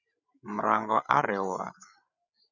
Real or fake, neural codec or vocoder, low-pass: fake; codec, 16 kHz, 8 kbps, FreqCodec, larger model; 7.2 kHz